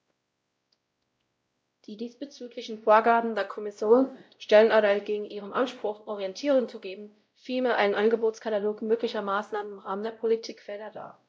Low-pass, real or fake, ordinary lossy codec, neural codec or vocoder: none; fake; none; codec, 16 kHz, 0.5 kbps, X-Codec, WavLM features, trained on Multilingual LibriSpeech